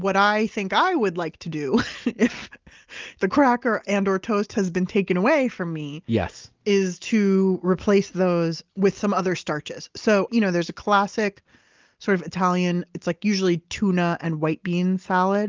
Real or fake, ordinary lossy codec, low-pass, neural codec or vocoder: real; Opus, 24 kbps; 7.2 kHz; none